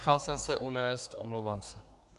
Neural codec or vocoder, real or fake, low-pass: codec, 24 kHz, 1 kbps, SNAC; fake; 10.8 kHz